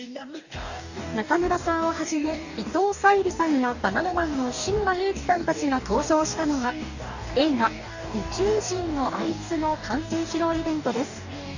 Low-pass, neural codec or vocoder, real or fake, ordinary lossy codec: 7.2 kHz; codec, 44.1 kHz, 2.6 kbps, DAC; fake; none